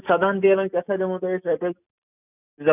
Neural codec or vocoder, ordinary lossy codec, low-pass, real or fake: none; none; 3.6 kHz; real